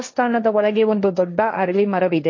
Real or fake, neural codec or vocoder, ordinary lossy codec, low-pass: fake; codec, 16 kHz, 1.1 kbps, Voila-Tokenizer; MP3, 32 kbps; 7.2 kHz